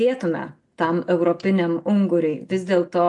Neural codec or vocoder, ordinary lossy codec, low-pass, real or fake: none; AAC, 64 kbps; 10.8 kHz; real